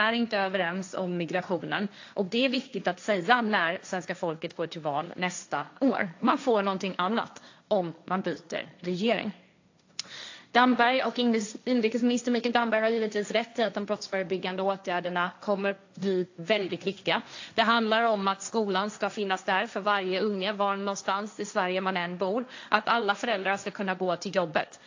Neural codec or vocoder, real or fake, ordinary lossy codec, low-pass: codec, 16 kHz, 1.1 kbps, Voila-Tokenizer; fake; AAC, 48 kbps; 7.2 kHz